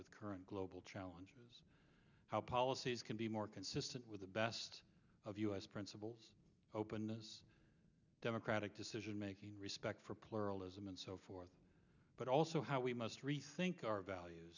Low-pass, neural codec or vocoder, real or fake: 7.2 kHz; none; real